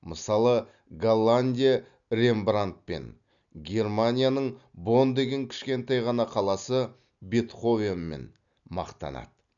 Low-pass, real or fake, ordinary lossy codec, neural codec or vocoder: 7.2 kHz; real; none; none